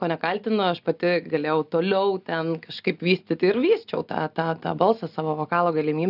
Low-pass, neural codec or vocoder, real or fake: 5.4 kHz; none; real